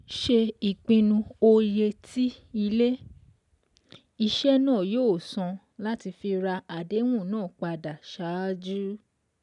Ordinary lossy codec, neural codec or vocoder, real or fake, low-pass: none; none; real; 10.8 kHz